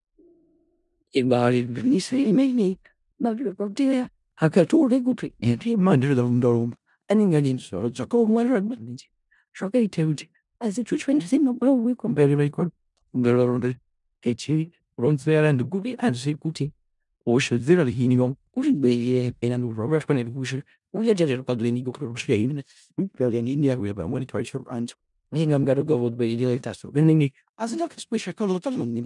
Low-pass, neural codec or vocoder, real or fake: 10.8 kHz; codec, 16 kHz in and 24 kHz out, 0.4 kbps, LongCat-Audio-Codec, four codebook decoder; fake